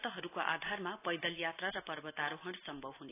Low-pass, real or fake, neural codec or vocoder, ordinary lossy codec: 3.6 kHz; real; none; AAC, 24 kbps